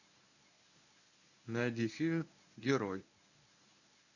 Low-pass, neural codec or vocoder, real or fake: 7.2 kHz; codec, 24 kHz, 0.9 kbps, WavTokenizer, medium speech release version 1; fake